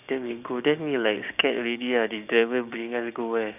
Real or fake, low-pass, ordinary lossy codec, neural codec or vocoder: fake; 3.6 kHz; none; codec, 16 kHz, 6 kbps, DAC